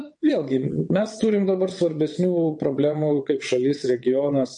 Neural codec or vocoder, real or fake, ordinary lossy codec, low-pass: vocoder, 22.05 kHz, 80 mel bands, WaveNeXt; fake; MP3, 48 kbps; 9.9 kHz